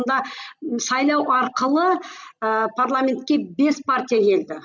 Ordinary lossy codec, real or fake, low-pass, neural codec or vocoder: none; real; 7.2 kHz; none